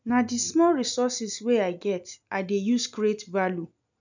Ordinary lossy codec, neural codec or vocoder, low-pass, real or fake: none; none; 7.2 kHz; real